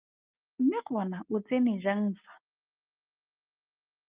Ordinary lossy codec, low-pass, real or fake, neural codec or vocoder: Opus, 32 kbps; 3.6 kHz; real; none